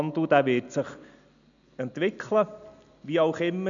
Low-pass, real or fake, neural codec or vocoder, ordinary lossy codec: 7.2 kHz; real; none; none